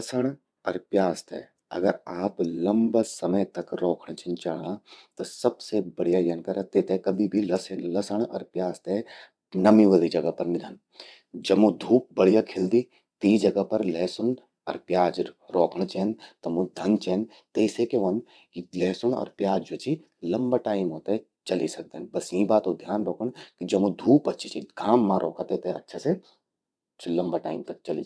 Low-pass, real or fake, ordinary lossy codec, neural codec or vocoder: none; fake; none; vocoder, 22.05 kHz, 80 mel bands, WaveNeXt